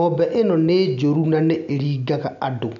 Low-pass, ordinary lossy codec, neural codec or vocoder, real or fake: 7.2 kHz; none; none; real